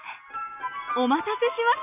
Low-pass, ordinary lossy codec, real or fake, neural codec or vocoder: 3.6 kHz; AAC, 32 kbps; real; none